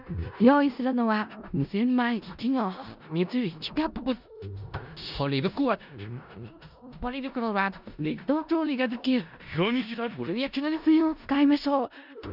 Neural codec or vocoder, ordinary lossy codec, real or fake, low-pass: codec, 16 kHz in and 24 kHz out, 0.4 kbps, LongCat-Audio-Codec, four codebook decoder; none; fake; 5.4 kHz